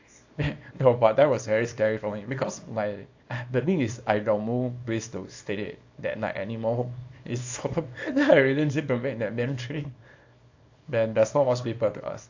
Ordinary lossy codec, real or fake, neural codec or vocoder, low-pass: AAC, 48 kbps; fake; codec, 24 kHz, 0.9 kbps, WavTokenizer, small release; 7.2 kHz